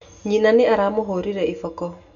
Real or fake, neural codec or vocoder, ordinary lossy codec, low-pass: real; none; none; 7.2 kHz